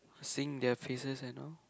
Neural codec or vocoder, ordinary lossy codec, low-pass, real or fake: none; none; none; real